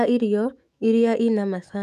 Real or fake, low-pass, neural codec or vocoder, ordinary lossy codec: fake; 14.4 kHz; autoencoder, 48 kHz, 128 numbers a frame, DAC-VAE, trained on Japanese speech; none